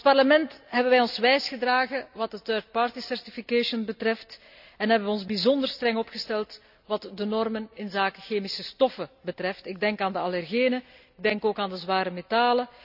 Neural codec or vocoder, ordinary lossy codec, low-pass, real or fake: none; none; 5.4 kHz; real